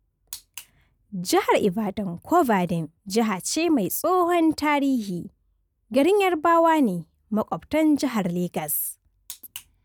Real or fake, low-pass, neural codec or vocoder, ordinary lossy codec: real; none; none; none